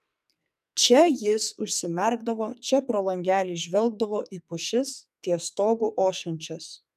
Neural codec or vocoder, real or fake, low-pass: codec, 44.1 kHz, 2.6 kbps, SNAC; fake; 14.4 kHz